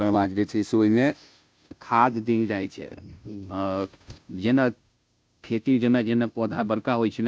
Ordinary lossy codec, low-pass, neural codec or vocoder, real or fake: none; none; codec, 16 kHz, 0.5 kbps, FunCodec, trained on Chinese and English, 25 frames a second; fake